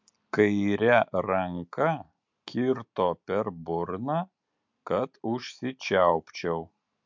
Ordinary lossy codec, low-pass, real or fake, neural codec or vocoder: MP3, 64 kbps; 7.2 kHz; real; none